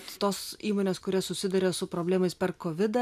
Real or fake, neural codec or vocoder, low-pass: real; none; 14.4 kHz